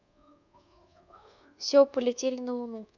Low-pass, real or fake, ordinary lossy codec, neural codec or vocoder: 7.2 kHz; fake; none; codec, 24 kHz, 1.2 kbps, DualCodec